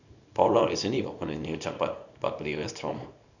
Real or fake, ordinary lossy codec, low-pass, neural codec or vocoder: fake; none; 7.2 kHz; codec, 24 kHz, 0.9 kbps, WavTokenizer, small release